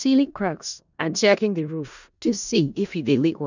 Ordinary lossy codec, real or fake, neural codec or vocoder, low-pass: none; fake; codec, 16 kHz in and 24 kHz out, 0.4 kbps, LongCat-Audio-Codec, four codebook decoder; 7.2 kHz